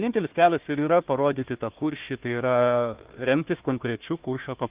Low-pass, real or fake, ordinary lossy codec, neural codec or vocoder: 3.6 kHz; fake; Opus, 24 kbps; codec, 16 kHz, 1 kbps, FunCodec, trained on Chinese and English, 50 frames a second